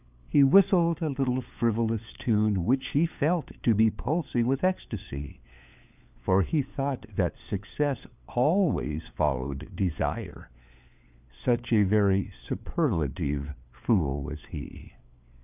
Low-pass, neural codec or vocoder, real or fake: 3.6 kHz; codec, 16 kHz, 4 kbps, FunCodec, trained on LibriTTS, 50 frames a second; fake